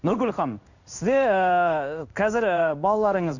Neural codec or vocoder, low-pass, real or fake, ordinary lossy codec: codec, 16 kHz in and 24 kHz out, 1 kbps, XY-Tokenizer; 7.2 kHz; fake; none